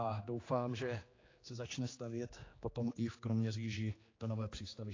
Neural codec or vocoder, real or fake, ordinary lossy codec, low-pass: codec, 16 kHz, 2 kbps, X-Codec, HuBERT features, trained on general audio; fake; AAC, 32 kbps; 7.2 kHz